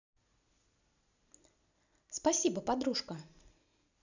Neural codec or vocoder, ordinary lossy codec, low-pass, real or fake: none; none; 7.2 kHz; real